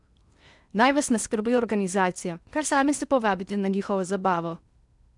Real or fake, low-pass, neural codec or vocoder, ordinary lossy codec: fake; 10.8 kHz; codec, 16 kHz in and 24 kHz out, 0.8 kbps, FocalCodec, streaming, 65536 codes; none